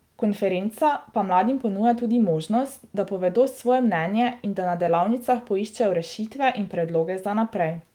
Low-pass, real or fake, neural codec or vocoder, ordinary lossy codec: 19.8 kHz; fake; autoencoder, 48 kHz, 128 numbers a frame, DAC-VAE, trained on Japanese speech; Opus, 24 kbps